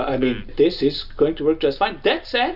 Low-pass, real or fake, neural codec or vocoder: 5.4 kHz; real; none